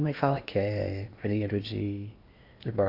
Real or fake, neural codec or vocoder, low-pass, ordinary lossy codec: fake; codec, 16 kHz in and 24 kHz out, 0.8 kbps, FocalCodec, streaming, 65536 codes; 5.4 kHz; AAC, 32 kbps